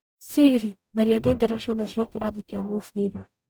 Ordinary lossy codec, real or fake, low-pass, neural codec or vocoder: none; fake; none; codec, 44.1 kHz, 0.9 kbps, DAC